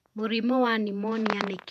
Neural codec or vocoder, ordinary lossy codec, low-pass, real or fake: vocoder, 48 kHz, 128 mel bands, Vocos; none; 14.4 kHz; fake